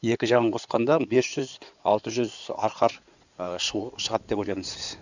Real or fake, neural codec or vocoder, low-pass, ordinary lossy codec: fake; codec, 16 kHz in and 24 kHz out, 2.2 kbps, FireRedTTS-2 codec; 7.2 kHz; none